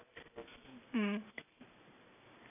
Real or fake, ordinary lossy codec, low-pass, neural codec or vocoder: real; none; 3.6 kHz; none